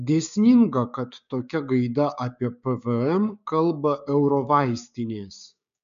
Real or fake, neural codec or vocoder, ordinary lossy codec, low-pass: fake; codec, 16 kHz, 6 kbps, DAC; MP3, 96 kbps; 7.2 kHz